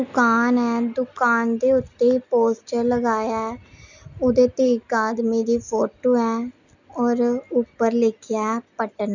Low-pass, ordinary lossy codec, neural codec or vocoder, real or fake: 7.2 kHz; none; none; real